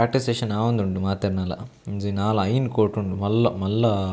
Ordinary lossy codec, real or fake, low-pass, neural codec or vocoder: none; real; none; none